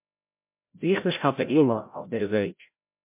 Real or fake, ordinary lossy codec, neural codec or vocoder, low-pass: fake; MP3, 32 kbps; codec, 16 kHz, 0.5 kbps, FreqCodec, larger model; 3.6 kHz